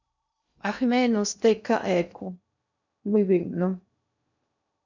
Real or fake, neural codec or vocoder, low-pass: fake; codec, 16 kHz in and 24 kHz out, 0.6 kbps, FocalCodec, streaming, 2048 codes; 7.2 kHz